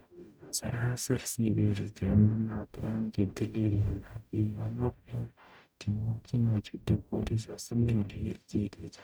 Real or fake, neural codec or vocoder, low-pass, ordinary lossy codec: fake; codec, 44.1 kHz, 0.9 kbps, DAC; none; none